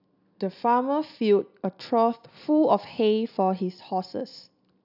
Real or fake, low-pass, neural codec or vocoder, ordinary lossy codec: real; 5.4 kHz; none; none